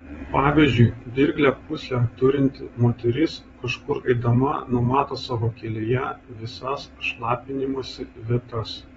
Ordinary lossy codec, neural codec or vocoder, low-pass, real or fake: AAC, 24 kbps; vocoder, 22.05 kHz, 80 mel bands, WaveNeXt; 9.9 kHz; fake